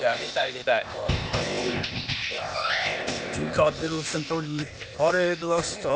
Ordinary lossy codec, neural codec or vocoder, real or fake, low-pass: none; codec, 16 kHz, 0.8 kbps, ZipCodec; fake; none